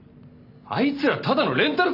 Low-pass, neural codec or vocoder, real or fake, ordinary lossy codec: 5.4 kHz; none; real; none